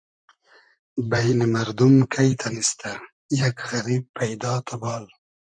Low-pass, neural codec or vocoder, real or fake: 9.9 kHz; vocoder, 44.1 kHz, 128 mel bands, Pupu-Vocoder; fake